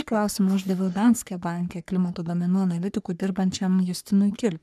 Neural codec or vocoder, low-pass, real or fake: codec, 44.1 kHz, 3.4 kbps, Pupu-Codec; 14.4 kHz; fake